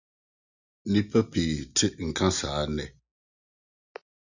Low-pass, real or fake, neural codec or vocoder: 7.2 kHz; real; none